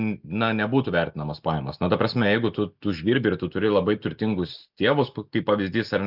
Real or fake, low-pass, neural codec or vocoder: real; 5.4 kHz; none